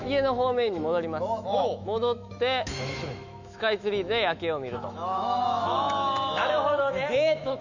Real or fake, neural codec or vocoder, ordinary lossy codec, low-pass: fake; autoencoder, 48 kHz, 128 numbers a frame, DAC-VAE, trained on Japanese speech; none; 7.2 kHz